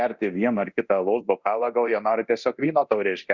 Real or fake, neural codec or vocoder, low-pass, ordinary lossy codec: fake; codec, 24 kHz, 0.9 kbps, DualCodec; 7.2 kHz; Opus, 64 kbps